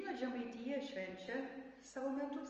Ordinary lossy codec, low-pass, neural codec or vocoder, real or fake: Opus, 24 kbps; 7.2 kHz; none; real